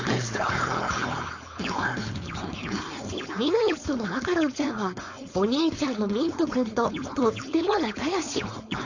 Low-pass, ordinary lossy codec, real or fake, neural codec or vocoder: 7.2 kHz; AAC, 48 kbps; fake; codec, 16 kHz, 4.8 kbps, FACodec